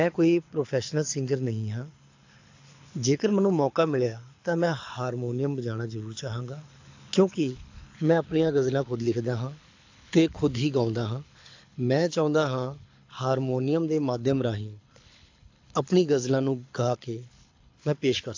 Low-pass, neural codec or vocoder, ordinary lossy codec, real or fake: 7.2 kHz; codec, 24 kHz, 6 kbps, HILCodec; AAC, 48 kbps; fake